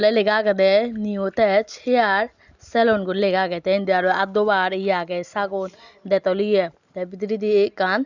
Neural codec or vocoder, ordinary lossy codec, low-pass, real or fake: none; Opus, 64 kbps; 7.2 kHz; real